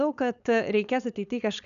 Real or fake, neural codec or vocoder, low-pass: fake; codec, 16 kHz, 4.8 kbps, FACodec; 7.2 kHz